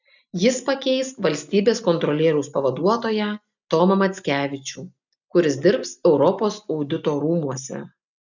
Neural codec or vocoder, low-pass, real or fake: none; 7.2 kHz; real